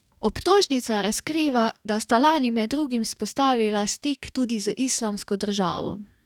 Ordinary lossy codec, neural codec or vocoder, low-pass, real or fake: none; codec, 44.1 kHz, 2.6 kbps, DAC; 19.8 kHz; fake